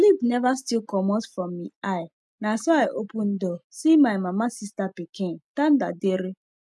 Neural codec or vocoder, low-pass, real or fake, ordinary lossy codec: none; none; real; none